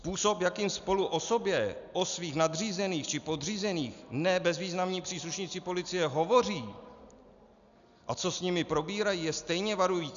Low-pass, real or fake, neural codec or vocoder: 7.2 kHz; real; none